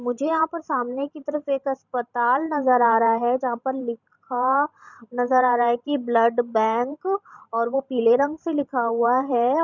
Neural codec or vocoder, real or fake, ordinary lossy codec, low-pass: vocoder, 44.1 kHz, 128 mel bands every 512 samples, BigVGAN v2; fake; none; 7.2 kHz